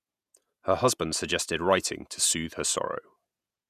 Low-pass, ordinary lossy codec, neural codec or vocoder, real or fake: 14.4 kHz; none; vocoder, 48 kHz, 128 mel bands, Vocos; fake